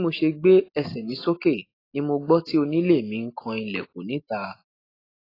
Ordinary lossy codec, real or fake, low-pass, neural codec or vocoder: AAC, 24 kbps; real; 5.4 kHz; none